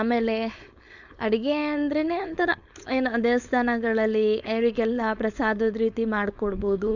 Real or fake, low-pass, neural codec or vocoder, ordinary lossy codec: fake; 7.2 kHz; codec, 16 kHz, 4.8 kbps, FACodec; none